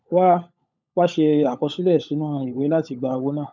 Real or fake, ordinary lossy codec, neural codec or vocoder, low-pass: fake; none; codec, 16 kHz, 16 kbps, FunCodec, trained on LibriTTS, 50 frames a second; 7.2 kHz